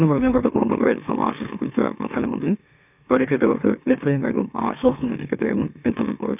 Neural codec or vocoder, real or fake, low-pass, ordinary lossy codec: autoencoder, 44.1 kHz, a latent of 192 numbers a frame, MeloTTS; fake; 3.6 kHz; none